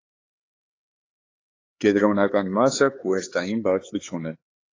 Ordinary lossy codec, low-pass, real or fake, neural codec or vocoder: AAC, 32 kbps; 7.2 kHz; fake; codec, 16 kHz, 4 kbps, X-Codec, HuBERT features, trained on LibriSpeech